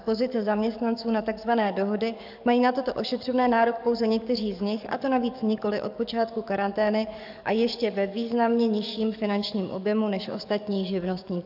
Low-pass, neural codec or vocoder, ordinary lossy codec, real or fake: 5.4 kHz; codec, 44.1 kHz, 7.8 kbps, DAC; AAC, 48 kbps; fake